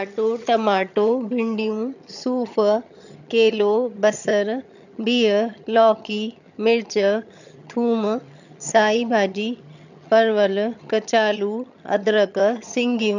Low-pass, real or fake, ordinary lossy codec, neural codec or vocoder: 7.2 kHz; fake; none; vocoder, 22.05 kHz, 80 mel bands, HiFi-GAN